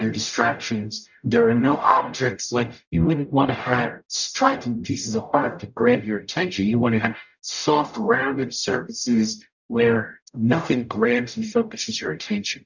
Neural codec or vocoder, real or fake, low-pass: codec, 44.1 kHz, 0.9 kbps, DAC; fake; 7.2 kHz